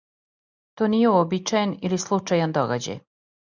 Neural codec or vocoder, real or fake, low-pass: none; real; 7.2 kHz